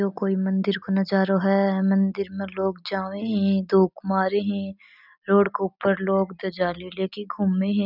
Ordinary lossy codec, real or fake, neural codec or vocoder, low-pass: none; real; none; 5.4 kHz